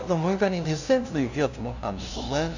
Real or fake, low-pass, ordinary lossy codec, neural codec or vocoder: fake; 7.2 kHz; none; codec, 16 kHz, 0.5 kbps, FunCodec, trained on LibriTTS, 25 frames a second